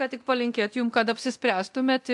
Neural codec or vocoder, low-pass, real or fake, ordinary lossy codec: codec, 24 kHz, 0.9 kbps, DualCodec; 10.8 kHz; fake; MP3, 64 kbps